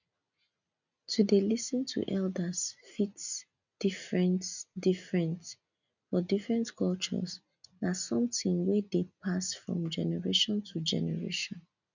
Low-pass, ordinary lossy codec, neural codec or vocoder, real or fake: 7.2 kHz; none; none; real